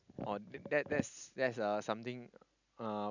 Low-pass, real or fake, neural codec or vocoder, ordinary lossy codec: 7.2 kHz; real; none; none